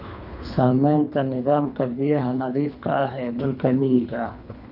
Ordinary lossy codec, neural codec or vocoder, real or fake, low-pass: none; codec, 24 kHz, 3 kbps, HILCodec; fake; 5.4 kHz